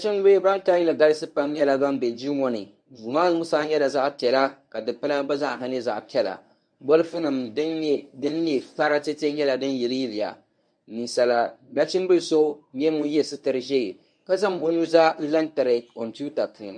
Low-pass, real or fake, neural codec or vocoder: 9.9 kHz; fake; codec, 24 kHz, 0.9 kbps, WavTokenizer, medium speech release version 1